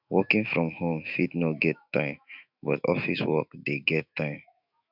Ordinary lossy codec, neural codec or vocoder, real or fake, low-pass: none; autoencoder, 48 kHz, 128 numbers a frame, DAC-VAE, trained on Japanese speech; fake; 5.4 kHz